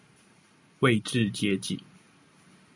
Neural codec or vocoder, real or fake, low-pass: none; real; 10.8 kHz